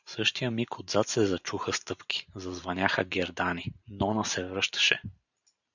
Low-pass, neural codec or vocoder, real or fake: 7.2 kHz; vocoder, 44.1 kHz, 128 mel bands every 512 samples, BigVGAN v2; fake